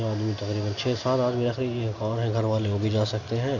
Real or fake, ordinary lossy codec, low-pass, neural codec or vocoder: real; none; 7.2 kHz; none